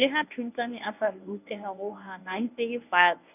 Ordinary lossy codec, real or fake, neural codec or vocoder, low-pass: none; fake; codec, 24 kHz, 0.9 kbps, WavTokenizer, medium speech release version 1; 3.6 kHz